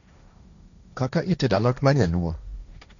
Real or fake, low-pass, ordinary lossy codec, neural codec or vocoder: fake; 7.2 kHz; none; codec, 16 kHz, 1.1 kbps, Voila-Tokenizer